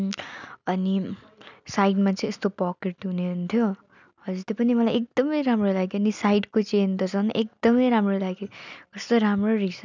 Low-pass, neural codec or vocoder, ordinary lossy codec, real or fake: 7.2 kHz; none; none; real